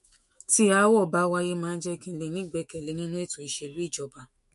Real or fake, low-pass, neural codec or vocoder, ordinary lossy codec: fake; 14.4 kHz; autoencoder, 48 kHz, 128 numbers a frame, DAC-VAE, trained on Japanese speech; MP3, 48 kbps